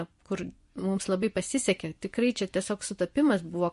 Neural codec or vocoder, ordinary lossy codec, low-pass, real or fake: vocoder, 24 kHz, 100 mel bands, Vocos; MP3, 48 kbps; 10.8 kHz; fake